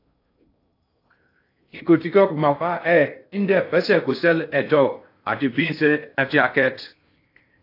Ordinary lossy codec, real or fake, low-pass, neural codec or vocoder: AAC, 32 kbps; fake; 5.4 kHz; codec, 16 kHz in and 24 kHz out, 0.6 kbps, FocalCodec, streaming, 2048 codes